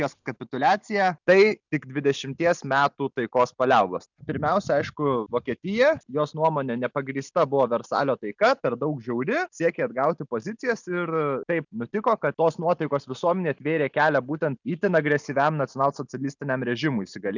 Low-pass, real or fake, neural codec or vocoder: 7.2 kHz; real; none